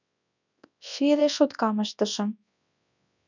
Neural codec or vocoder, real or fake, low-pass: codec, 24 kHz, 0.9 kbps, WavTokenizer, large speech release; fake; 7.2 kHz